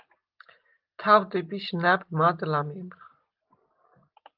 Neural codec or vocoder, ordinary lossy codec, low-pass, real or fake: none; Opus, 24 kbps; 5.4 kHz; real